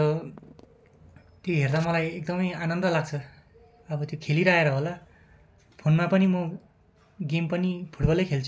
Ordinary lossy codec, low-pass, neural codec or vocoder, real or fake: none; none; none; real